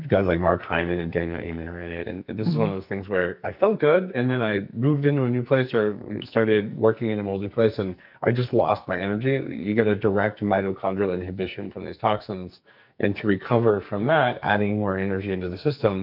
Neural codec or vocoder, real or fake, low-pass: codec, 44.1 kHz, 2.6 kbps, SNAC; fake; 5.4 kHz